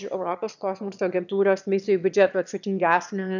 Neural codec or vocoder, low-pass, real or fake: autoencoder, 22.05 kHz, a latent of 192 numbers a frame, VITS, trained on one speaker; 7.2 kHz; fake